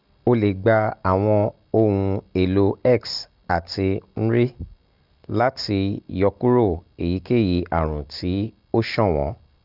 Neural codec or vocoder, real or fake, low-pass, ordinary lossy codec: none; real; 5.4 kHz; Opus, 24 kbps